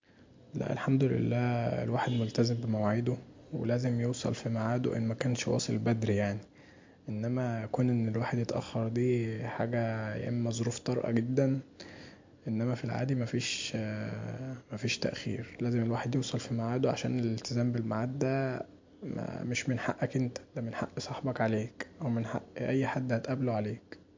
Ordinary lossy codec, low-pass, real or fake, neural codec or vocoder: MP3, 48 kbps; 7.2 kHz; real; none